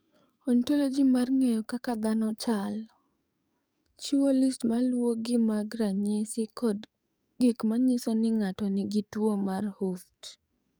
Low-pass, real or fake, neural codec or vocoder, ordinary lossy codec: none; fake; codec, 44.1 kHz, 7.8 kbps, DAC; none